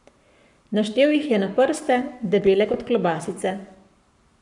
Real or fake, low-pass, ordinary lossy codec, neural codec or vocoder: fake; 10.8 kHz; none; codec, 44.1 kHz, 7.8 kbps, Pupu-Codec